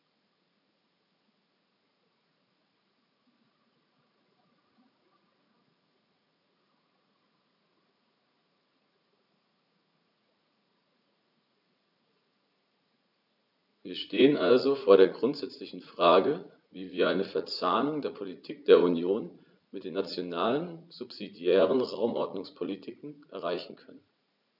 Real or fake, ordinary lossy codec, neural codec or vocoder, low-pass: fake; none; vocoder, 44.1 kHz, 80 mel bands, Vocos; 5.4 kHz